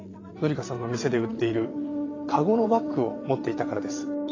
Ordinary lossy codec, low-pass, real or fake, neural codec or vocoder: AAC, 32 kbps; 7.2 kHz; fake; vocoder, 22.05 kHz, 80 mel bands, WaveNeXt